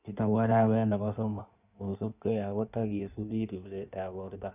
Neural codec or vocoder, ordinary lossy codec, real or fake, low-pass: codec, 16 kHz in and 24 kHz out, 1.1 kbps, FireRedTTS-2 codec; none; fake; 3.6 kHz